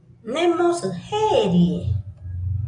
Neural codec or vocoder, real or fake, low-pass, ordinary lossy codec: none; real; 9.9 kHz; AAC, 48 kbps